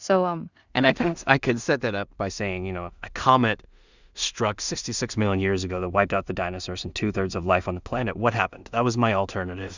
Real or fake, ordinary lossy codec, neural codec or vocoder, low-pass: fake; Opus, 64 kbps; codec, 16 kHz in and 24 kHz out, 0.4 kbps, LongCat-Audio-Codec, two codebook decoder; 7.2 kHz